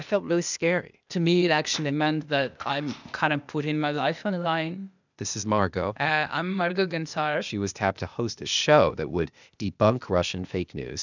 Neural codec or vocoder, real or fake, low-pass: codec, 16 kHz, 0.8 kbps, ZipCodec; fake; 7.2 kHz